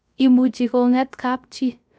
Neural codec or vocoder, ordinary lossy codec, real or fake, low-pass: codec, 16 kHz, 0.3 kbps, FocalCodec; none; fake; none